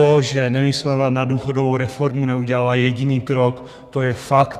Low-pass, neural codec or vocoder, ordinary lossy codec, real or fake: 14.4 kHz; codec, 32 kHz, 1.9 kbps, SNAC; Opus, 64 kbps; fake